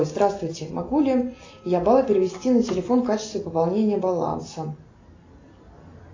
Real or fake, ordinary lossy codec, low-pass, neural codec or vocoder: real; AAC, 32 kbps; 7.2 kHz; none